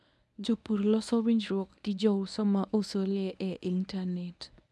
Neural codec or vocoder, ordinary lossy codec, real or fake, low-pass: codec, 24 kHz, 0.9 kbps, WavTokenizer, medium speech release version 1; none; fake; none